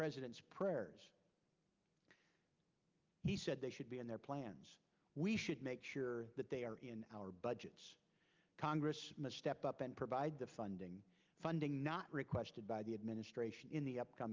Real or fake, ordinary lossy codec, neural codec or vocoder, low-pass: real; Opus, 24 kbps; none; 7.2 kHz